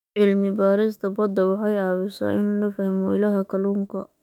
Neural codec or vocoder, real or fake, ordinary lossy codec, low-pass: autoencoder, 48 kHz, 32 numbers a frame, DAC-VAE, trained on Japanese speech; fake; none; 19.8 kHz